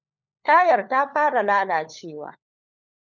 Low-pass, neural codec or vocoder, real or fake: 7.2 kHz; codec, 16 kHz, 4 kbps, FunCodec, trained on LibriTTS, 50 frames a second; fake